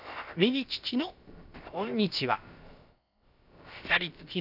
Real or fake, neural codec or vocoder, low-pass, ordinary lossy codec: fake; codec, 16 kHz, about 1 kbps, DyCAST, with the encoder's durations; 5.4 kHz; none